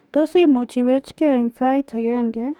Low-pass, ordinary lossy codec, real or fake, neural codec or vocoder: 19.8 kHz; none; fake; codec, 44.1 kHz, 2.6 kbps, DAC